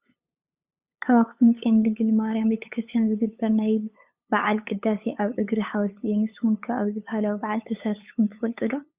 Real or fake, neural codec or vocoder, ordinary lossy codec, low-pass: fake; codec, 16 kHz, 8 kbps, FunCodec, trained on LibriTTS, 25 frames a second; Opus, 64 kbps; 3.6 kHz